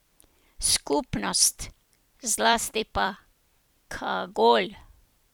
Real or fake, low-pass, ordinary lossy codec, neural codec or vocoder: fake; none; none; vocoder, 44.1 kHz, 128 mel bands every 256 samples, BigVGAN v2